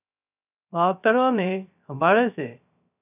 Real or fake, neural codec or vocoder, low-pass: fake; codec, 16 kHz, 0.3 kbps, FocalCodec; 3.6 kHz